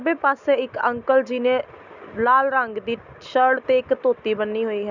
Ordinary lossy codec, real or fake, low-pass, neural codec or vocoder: none; real; 7.2 kHz; none